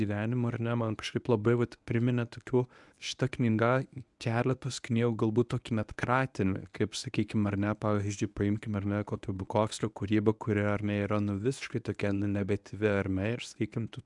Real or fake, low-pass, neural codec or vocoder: fake; 10.8 kHz; codec, 24 kHz, 0.9 kbps, WavTokenizer, medium speech release version 1